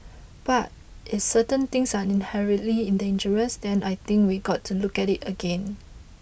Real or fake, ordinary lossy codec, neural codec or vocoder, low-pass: real; none; none; none